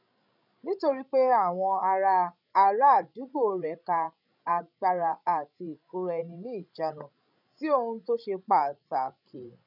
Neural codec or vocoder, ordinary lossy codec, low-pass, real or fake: codec, 16 kHz, 16 kbps, FreqCodec, larger model; none; 5.4 kHz; fake